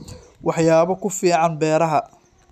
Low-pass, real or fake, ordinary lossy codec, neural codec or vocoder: 14.4 kHz; real; none; none